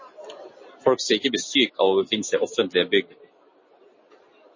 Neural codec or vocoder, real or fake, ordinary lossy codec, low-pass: none; real; MP3, 32 kbps; 7.2 kHz